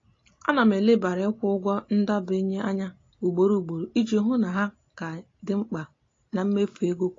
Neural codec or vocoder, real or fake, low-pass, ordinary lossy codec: none; real; 7.2 kHz; AAC, 32 kbps